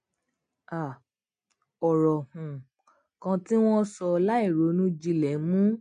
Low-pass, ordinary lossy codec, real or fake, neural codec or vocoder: 9.9 kHz; MP3, 48 kbps; real; none